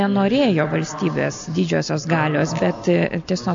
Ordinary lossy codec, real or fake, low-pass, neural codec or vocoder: AAC, 64 kbps; real; 7.2 kHz; none